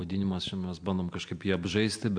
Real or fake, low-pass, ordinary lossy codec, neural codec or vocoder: real; 9.9 kHz; AAC, 64 kbps; none